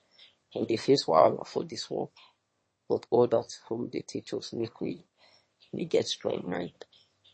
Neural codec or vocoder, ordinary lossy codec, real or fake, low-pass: autoencoder, 22.05 kHz, a latent of 192 numbers a frame, VITS, trained on one speaker; MP3, 32 kbps; fake; 9.9 kHz